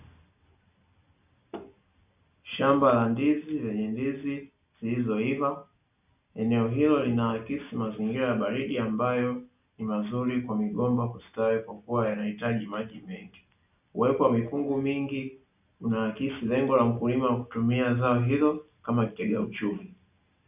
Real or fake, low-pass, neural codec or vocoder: real; 3.6 kHz; none